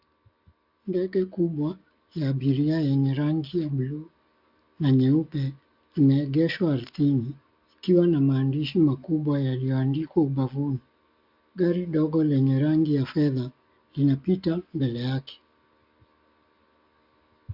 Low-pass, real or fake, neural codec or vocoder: 5.4 kHz; real; none